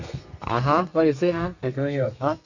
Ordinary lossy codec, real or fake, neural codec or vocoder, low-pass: none; fake; codec, 32 kHz, 1.9 kbps, SNAC; 7.2 kHz